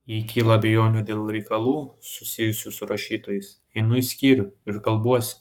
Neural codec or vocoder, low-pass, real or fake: codec, 44.1 kHz, 7.8 kbps, Pupu-Codec; 19.8 kHz; fake